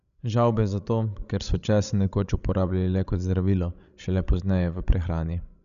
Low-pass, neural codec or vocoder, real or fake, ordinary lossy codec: 7.2 kHz; codec, 16 kHz, 16 kbps, FreqCodec, larger model; fake; none